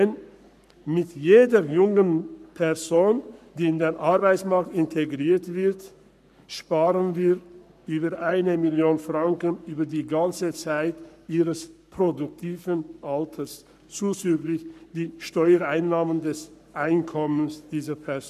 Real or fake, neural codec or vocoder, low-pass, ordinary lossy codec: fake; codec, 44.1 kHz, 7.8 kbps, Pupu-Codec; 14.4 kHz; none